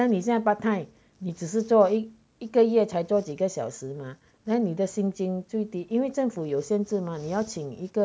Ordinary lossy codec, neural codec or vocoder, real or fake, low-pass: none; none; real; none